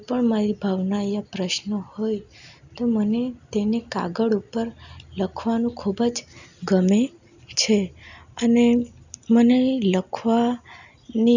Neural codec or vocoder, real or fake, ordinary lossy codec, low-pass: none; real; none; 7.2 kHz